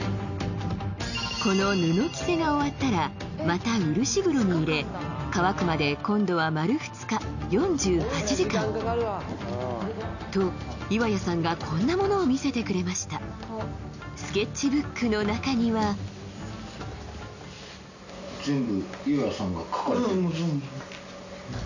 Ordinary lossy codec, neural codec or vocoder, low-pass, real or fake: none; none; 7.2 kHz; real